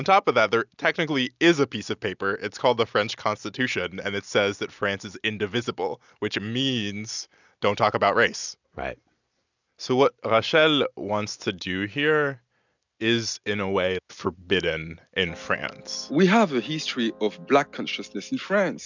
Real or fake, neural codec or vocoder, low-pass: real; none; 7.2 kHz